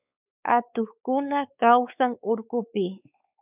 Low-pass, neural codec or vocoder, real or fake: 3.6 kHz; codec, 16 kHz, 4 kbps, X-Codec, WavLM features, trained on Multilingual LibriSpeech; fake